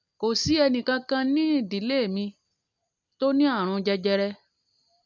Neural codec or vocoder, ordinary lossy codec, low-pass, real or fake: none; none; 7.2 kHz; real